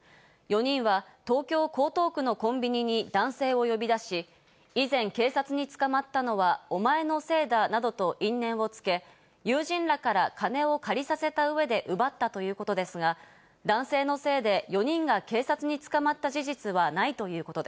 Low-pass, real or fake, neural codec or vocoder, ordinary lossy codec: none; real; none; none